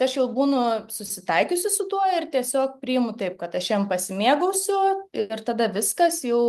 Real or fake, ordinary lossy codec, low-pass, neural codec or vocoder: real; Opus, 32 kbps; 14.4 kHz; none